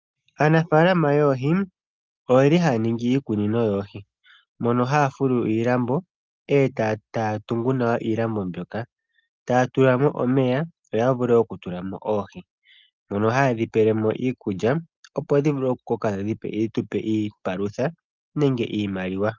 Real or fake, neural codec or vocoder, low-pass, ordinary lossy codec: real; none; 7.2 kHz; Opus, 24 kbps